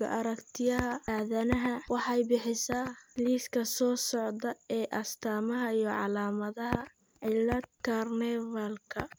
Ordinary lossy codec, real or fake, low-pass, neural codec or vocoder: none; real; none; none